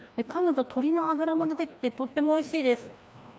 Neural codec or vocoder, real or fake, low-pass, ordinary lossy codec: codec, 16 kHz, 1 kbps, FreqCodec, larger model; fake; none; none